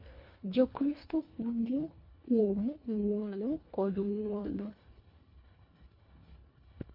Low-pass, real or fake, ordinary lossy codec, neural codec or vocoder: 5.4 kHz; fake; MP3, 32 kbps; codec, 24 kHz, 1.5 kbps, HILCodec